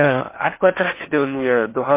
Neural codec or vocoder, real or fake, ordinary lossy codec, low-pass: codec, 16 kHz in and 24 kHz out, 0.6 kbps, FocalCodec, streaming, 4096 codes; fake; MP3, 24 kbps; 3.6 kHz